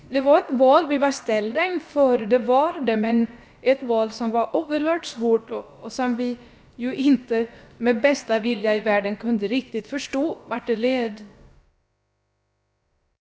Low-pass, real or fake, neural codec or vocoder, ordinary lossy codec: none; fake; codec, 16 kHz, about 1 kbps, DyCAST, with the encoder's durations; none